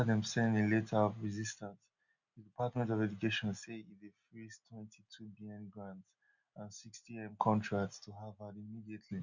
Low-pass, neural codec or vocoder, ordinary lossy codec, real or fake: 7.2 kHz; none; none; real